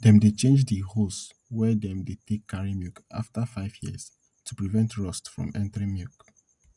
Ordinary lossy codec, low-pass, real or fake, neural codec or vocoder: none; 10.8 kHz; real; none